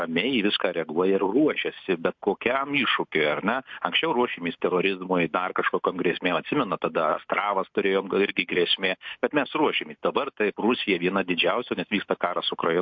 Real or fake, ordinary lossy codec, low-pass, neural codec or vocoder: real; MP3, 48 kbps; 7.2 kHz; none